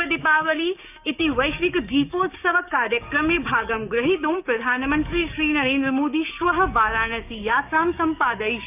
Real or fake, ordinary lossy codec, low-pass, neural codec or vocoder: fake; none; 3.6 kHz; codec, 16 kHz, 6 kbps, DAC